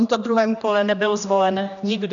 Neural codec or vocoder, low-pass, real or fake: codec, 16 kHz, 1 kbps, X-Codec, HuBERT features, trained on general audio; 7.2 kHz; fake